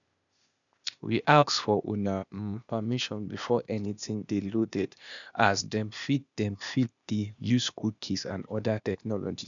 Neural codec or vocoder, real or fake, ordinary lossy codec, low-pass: codec, 16 kHz, 0.8 kbps, ZipCodec; fake; none; 7.2 kHz